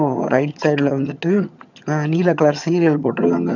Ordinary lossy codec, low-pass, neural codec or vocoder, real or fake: none; 7.2 kHz; vocoder, 22.05 kHz, 80 mel bands, HiFi-GAN; fake